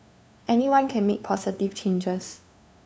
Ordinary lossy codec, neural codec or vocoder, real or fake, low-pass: none; codec, 16 kHz, 2 kbps, FunCodec, trained on LibriTTS, 25 frames a second; fake; none